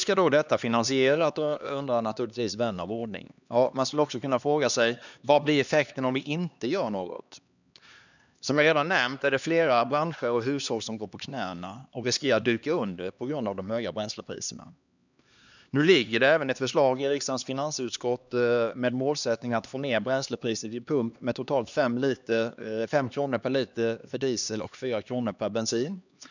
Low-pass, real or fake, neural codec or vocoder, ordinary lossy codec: 7.2 kHz; fake; codec, 16 kHz, 2 kbps, X-Codec, HuBERT features, trained on LibriSpeech; none